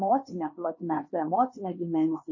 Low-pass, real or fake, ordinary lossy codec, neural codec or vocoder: 7.2 kHz; fake; MP3, 32 kbps; codec, 16 kHz, 2 kbps, X-Codec, HuBERT features, trained on LibriSpeech